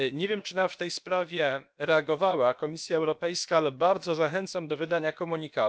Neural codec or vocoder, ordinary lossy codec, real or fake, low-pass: codec, 16 kHz, about 1 kbps, DyCAST, with the encoder's durations; none; fake; none